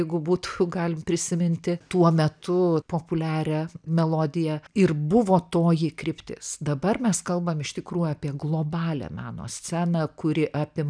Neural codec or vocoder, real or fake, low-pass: none; real; 9.9 kHz